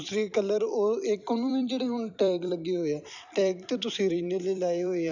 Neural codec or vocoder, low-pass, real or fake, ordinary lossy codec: vocoder, 44.1 kHz, 128 mel bands every 256 samples, BigVGAN v2; 7.2 kHz; fake; none